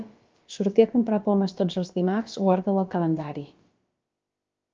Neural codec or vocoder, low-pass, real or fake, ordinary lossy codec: codec, 16 kHz, about 1 kbps, DyCAST, with the encoder's durations; 7.2 kHz; fake; Opus, 24 kbps